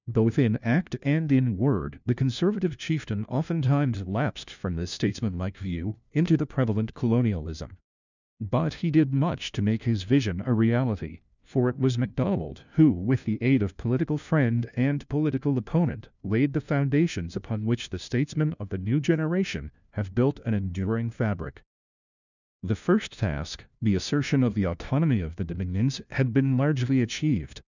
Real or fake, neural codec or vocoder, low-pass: fake; codec, 16 kHz, 1 kbps, FunCodec, trained on LibriTTS, 50 frames a second; 7.2 kHz